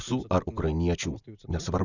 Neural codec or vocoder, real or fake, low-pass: none; real; 7.2 kHz